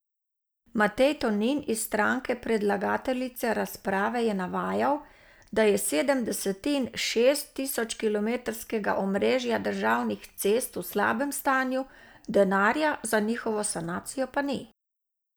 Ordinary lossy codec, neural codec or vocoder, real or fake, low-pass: none; none; real; none